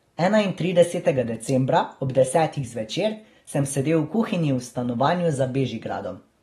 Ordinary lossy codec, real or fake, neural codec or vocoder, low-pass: AAC, 32 kbps; real; none; 19.8 kHz